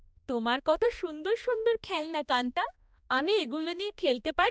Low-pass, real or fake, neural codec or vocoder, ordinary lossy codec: none; fake; codec, 16 kHz, 1 kbps, X-Codec, HuBERT features, trained on balanced general audio; none